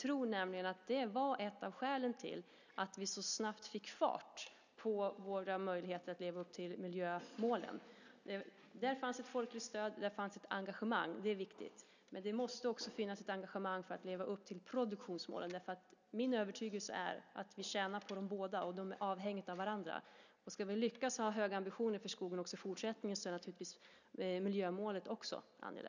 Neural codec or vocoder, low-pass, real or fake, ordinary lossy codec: none; 7.2 kHz; real; none